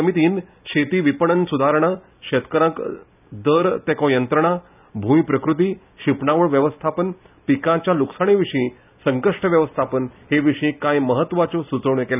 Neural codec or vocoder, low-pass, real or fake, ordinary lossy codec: none; 3.6 kHz; real; none